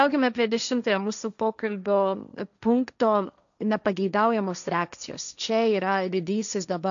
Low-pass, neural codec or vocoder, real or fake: 7.2 kHz; codec, 16 kHz, 1.1 kbps, Voila-Tokenizer; fake